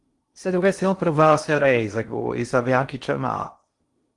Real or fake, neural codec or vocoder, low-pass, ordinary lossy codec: fake; codec, 16 kHz in and 24 kHz out, 0.6 kbps, FocalCodec, streaming, 2048 codes; 10.8 kHz; Opus, 24 kbps